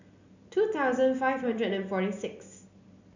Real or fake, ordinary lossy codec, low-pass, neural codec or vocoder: real; none; 7.2 kHz; none